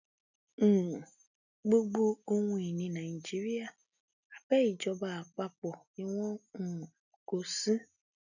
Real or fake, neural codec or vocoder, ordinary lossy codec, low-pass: real; none; none; 7.2 kHz